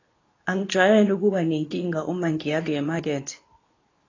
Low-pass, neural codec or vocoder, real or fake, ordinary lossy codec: 7.2 kHz; codec, 24 kHz, 0.9 kbps, WavTokenizer, medium speech release version 2; fake; AAC, 32 kbps